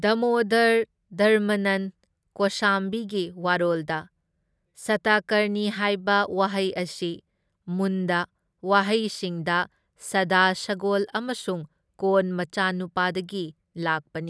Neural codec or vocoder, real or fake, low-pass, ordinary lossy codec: none; real; none; none